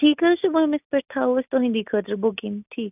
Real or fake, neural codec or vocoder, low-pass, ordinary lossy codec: real; none; 3.6 kHz; none